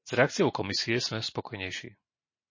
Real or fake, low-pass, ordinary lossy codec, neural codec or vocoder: real; 7.2 kHz; MP3, 32 kbps; none